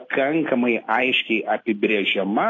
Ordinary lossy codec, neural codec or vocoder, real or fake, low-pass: AAC, 32 kbps; none; real; 7.2 kHz